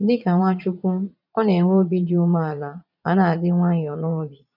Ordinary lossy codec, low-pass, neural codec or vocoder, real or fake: none; 5.4 kHz; vocoder, 22.05 kHz, 80 mel bands, Vocos; fake